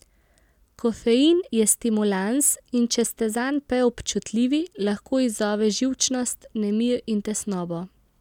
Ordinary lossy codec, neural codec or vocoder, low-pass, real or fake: none; none; 19.8 kHz; real